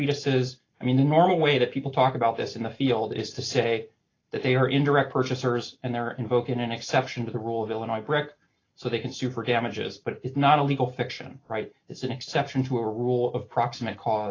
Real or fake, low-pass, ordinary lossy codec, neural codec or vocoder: real; 7.2 kHz; AAC, 32 kbps; none